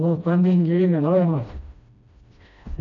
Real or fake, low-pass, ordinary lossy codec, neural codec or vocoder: fake; 7.2 kHz; none; codec, 16 kHz, 1 kbps, FreqCodec, smaller model